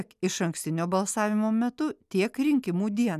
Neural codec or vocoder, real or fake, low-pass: none; real; 14.4 kHz